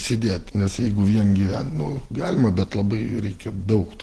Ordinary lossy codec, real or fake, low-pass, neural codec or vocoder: Opus, 16 kbps; real; 10.8 kHz; none